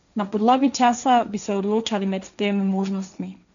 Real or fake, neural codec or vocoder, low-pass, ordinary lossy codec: fake; codec, 16 kHz, 1.1 kbps, Voila-Tokenizer; 7.2 kHz; none